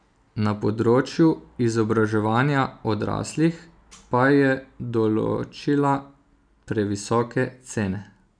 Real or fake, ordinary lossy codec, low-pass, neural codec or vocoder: real; none; 9.9 kHz; none